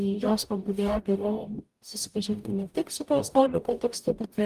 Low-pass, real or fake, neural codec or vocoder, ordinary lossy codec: 14.4 kHz; fake; codec, 44.1 kHz, 0.9 kbps, DAC; Opus, 32 kbps